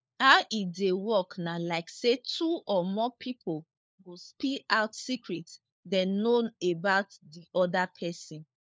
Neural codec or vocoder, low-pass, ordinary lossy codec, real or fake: codec, 16 kHz, 4 kbps, FunCodec, trained on LibriTTS, 50 frames a second; none; none; fake